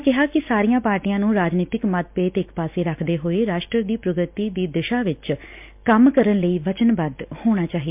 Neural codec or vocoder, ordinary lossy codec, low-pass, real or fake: none; MP3, 32 kbps; 3.6 kHz; real